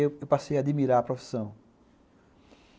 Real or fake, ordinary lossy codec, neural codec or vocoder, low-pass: real; none; none; none